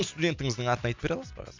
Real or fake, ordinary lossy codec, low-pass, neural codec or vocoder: real; MP3, 64 kbps; 7.2 kHz; none